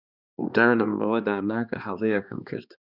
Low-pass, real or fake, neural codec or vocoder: 5.4 kHz; fake; codec, 16 kHz, 2 kbps, X-Codec, HuBERT features, trained on balanced general audio